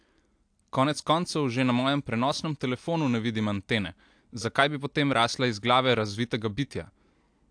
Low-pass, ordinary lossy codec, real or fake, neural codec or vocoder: 9.9 kHz; AAC, 64 kbps; real; none